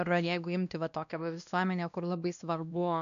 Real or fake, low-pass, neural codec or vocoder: fake; 7.2 kHz; codec, 16 kHz, 1 kbps, X-Codec, WavLM features, trained on Multilingual LibriSpeech